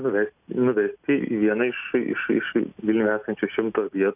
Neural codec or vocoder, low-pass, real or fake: none; 3.6 kHz; real